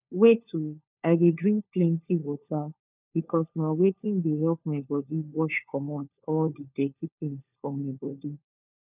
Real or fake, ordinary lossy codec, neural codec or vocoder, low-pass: fake; none; codec, 16 kHz, 4 kbps, FunCodec, trained on LibriTTS, 50 frames a second; 3.6 kHz